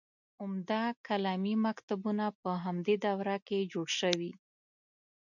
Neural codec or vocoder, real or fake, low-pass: none; real; 7.2 kHz